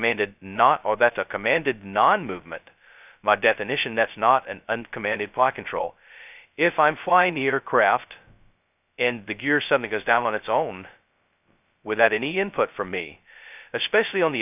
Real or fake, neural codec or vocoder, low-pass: fake; codec, 16 kHz, 0.2 kbps, FocalCodec; 3.6 kHz